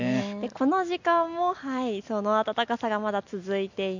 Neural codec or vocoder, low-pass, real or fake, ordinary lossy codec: none; 7.2 kHz; real; none